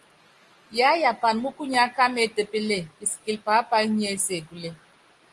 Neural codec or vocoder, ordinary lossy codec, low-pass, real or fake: none; Opus, 24 kbps; 10.8 kHz; real